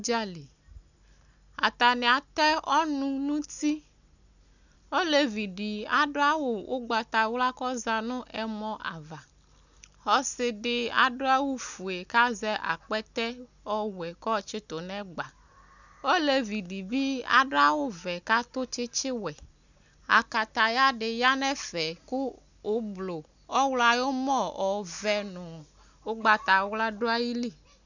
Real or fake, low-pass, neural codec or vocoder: real; 7.2 kHz; none